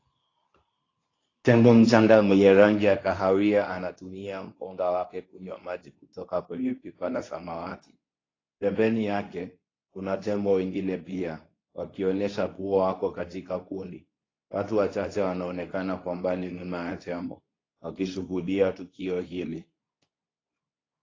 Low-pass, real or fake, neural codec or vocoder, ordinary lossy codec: 7.2 kHz; fake; codec, 24 kHz, 0.9 kbps, WavTokenizer, medium speech release version 2; AAC, 32 kbps